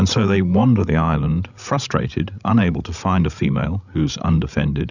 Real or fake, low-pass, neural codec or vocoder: fake; 7.2 kHz; codec, 16 kHz, 16 kbps, FreqCodec, larger model